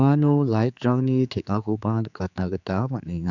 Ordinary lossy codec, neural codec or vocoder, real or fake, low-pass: none; codec, 16 kHz, 4 kbps, X-Codec, HuBERT features, trained on general audio; fake; 7.2 kHz